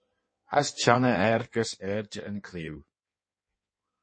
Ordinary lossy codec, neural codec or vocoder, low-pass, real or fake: MP3, 32 kbps; codec, 16 kHz in and 24 kHz out, 1.1 kbps, FireRedTTS-2 codec; 9.9 kHz; fake